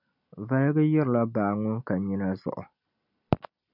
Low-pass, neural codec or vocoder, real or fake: 5.4 kHz; none; real